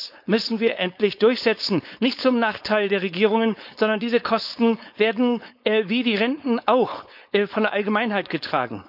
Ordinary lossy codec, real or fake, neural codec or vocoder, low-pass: none; fake; codec, 16 kHz, 4.8 kbps, FACodec; 5.4 kHz